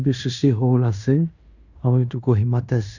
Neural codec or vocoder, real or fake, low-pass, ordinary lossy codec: codec, 16 kHz in and 24 kHz out, 0.9 kbps, LongCat-Audio-Codec, fine tuned four codebook decoder; fake; 7.2 kHz; none